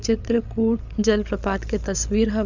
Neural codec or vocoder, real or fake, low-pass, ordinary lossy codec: codec, 16 kHz, 8 kbps, FreqCodec, larger model; fake; 7.2 kHz; none